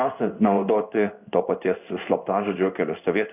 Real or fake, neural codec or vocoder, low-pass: fake; codec, 16 kHz in and 24 kHz out, 1 kbps, XY-Tokenizer; 3.6 kHz